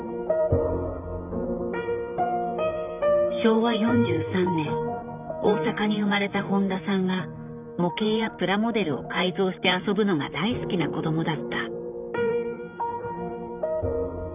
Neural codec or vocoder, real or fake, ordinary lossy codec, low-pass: vocoder, 44.1 kHz, 128 mel bands, Pupu-Vocoder; fake; none; 3.6 kHz